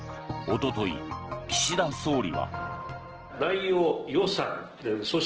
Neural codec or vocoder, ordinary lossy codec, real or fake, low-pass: none; Opus, 16 kbps; real; 7.2 kHz